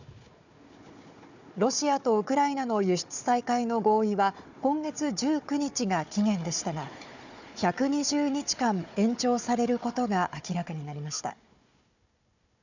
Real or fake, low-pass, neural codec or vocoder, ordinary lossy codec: fake; 7.2 kHz; codec, 16 kHz, 4 kbps, FunCodec, trained on Chinese and English, 50 frames a second; none